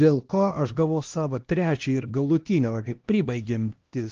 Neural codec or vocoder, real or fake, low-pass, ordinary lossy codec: codec, 16 kHz, 1 kbps, X-Codec, HuBERT features, trained on LibriSpeech; fake; 7.2 kHz; Opus, 16 kbps